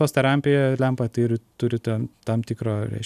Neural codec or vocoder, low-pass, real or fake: none; 14.4 kHz; real